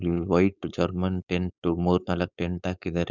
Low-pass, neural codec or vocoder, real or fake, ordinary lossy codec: 7.2 kHz; codec, 16 kHz, 4.8 kbps, FACodec; fake; none